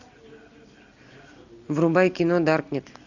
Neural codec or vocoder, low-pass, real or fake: none; 7.2 kHz; real